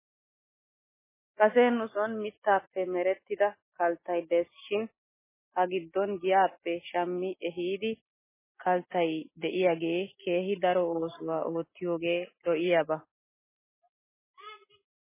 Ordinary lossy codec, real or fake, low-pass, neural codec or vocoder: MP3, 16 kbps; real; 3.6 kHz; none